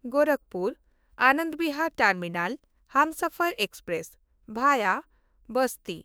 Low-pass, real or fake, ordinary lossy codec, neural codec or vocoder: none; fake; none; autoencoder, 48 kHz, 32 numbers a frame, DAC-VAE, trained on Japanese speech